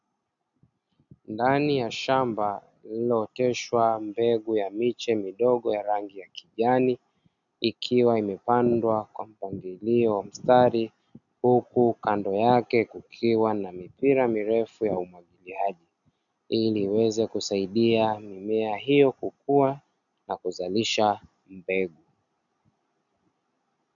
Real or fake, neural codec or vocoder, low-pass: real; none; 7.2 kHz